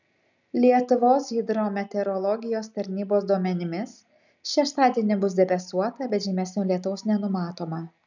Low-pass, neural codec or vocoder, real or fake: 7.2 kHz; none; real